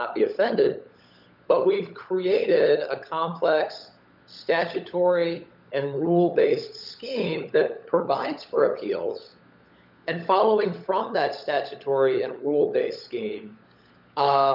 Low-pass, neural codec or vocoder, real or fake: 5.4 kHz; codec, 16 kHz, 16 kbps, FunCodec, trained on LibriTTS, 50 frames a second; fake